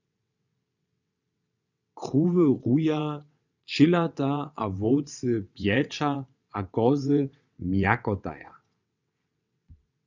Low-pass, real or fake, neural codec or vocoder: 7.2 kHz; fake; vocoder, 22.05 kHz, 80 mel bands, WaveNeXt